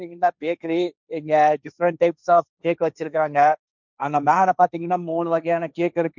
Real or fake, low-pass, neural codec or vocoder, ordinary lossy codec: fake; 7.2 kHz; codec, 16 kHz, 1.1 kbps, Voila-Tokenizer; none